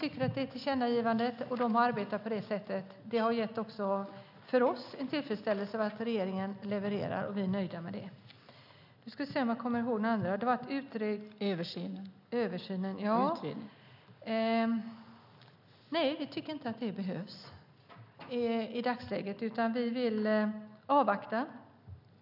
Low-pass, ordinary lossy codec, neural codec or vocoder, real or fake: 5.4 kHz; none; none; real